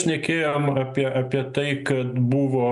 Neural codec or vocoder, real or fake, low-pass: none; real; 10.8 kHz